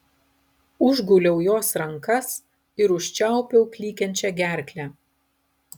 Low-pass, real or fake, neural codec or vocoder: 19.8 kHz; real; none